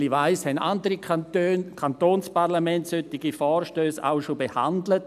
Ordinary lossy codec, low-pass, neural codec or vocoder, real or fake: none; 14.4 kHz; none; real